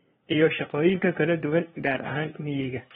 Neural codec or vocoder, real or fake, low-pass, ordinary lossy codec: codec, 16 kHz, 2 kbps, FunCodec, trained on LibriTTS, 25 frames a second; fake; 7.2 kHz; AAC, 16 kbps